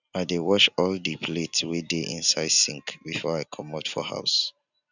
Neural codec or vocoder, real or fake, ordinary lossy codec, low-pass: none; real; none; 7.2 kHz